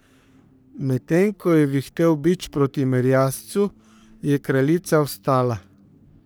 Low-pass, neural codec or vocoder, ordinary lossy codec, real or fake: none; codec, 44.1 kHz, 3.4 kbps, Pupu-Codec; none; fake